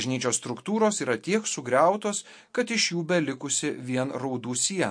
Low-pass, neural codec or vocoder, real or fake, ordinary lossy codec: 9.9 kHz; none; real; MP3, 48 kbps